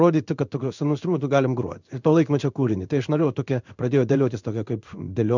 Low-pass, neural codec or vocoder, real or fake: 7.2 kHz; codec, 16 kHz in and 24 kHz out, 1 kbps, XY-Tokenizer; fake